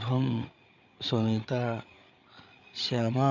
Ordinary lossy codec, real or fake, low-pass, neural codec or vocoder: none; fake; 7.2 kHz; codec, 16 kHz, 8 kbps, FreqCodec, larger model